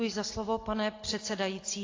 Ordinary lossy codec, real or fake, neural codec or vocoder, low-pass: AAC, 32 kbps; real; none; 7.2 kHz